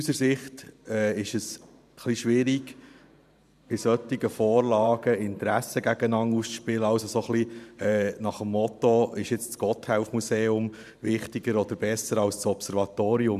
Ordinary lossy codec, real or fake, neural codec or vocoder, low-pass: none; fake; vocoder, 44.1 kHz, 128 mel bands every 512 samples, BigVGAN v2; 14.4 kHz